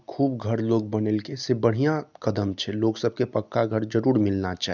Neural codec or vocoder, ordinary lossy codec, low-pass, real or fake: none; none; 7.2 kHz; real